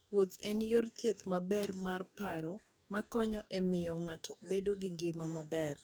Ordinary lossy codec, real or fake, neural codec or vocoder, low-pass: none; fake; codec, 44.1 kHz, 2.6 kbps, DAC; none